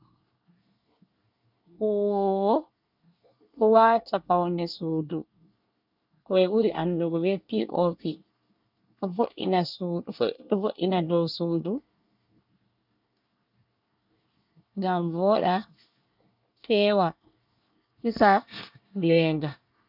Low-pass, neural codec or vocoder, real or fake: 5.4 kHz; codec, 24 kHz, 1 kbps, SNAC; fake